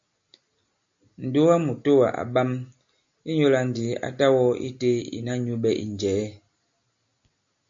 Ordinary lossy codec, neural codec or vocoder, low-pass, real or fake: MP3, 48 kbps; none; 7.2 kHz; real